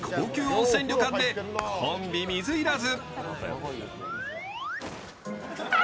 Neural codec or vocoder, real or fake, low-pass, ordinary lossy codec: none; real; none; none